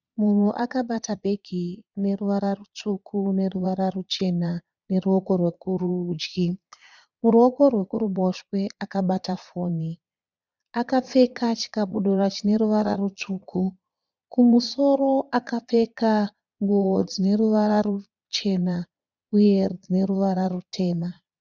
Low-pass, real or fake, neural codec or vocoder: 7.2 kHz; fake; vocoder, 22.05 kHz, 80 mel bands, Vocos